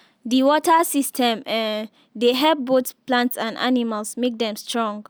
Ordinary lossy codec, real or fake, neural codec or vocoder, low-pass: none; real; none; none